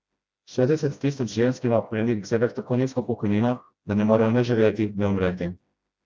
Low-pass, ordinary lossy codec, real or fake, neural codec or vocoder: none; none; fake; codec, 16 kHz, 1 kbps, FreqCodec, smaller model